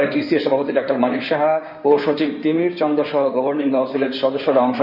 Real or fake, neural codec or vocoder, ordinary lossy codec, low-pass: fake; codec, 16 kHz in and 24 kHz out, 2.2 kbps, FireRedTTS-2 codec; none; 5.4 kHz